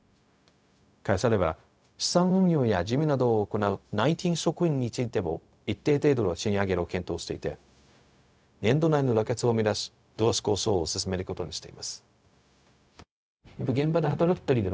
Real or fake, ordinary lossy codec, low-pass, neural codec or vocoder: fake; none; none; codec, 16 kHz, 0.4 kbps, LongCat-Audio-Codec